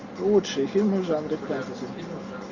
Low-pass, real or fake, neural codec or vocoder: 7.2 kHz; real; none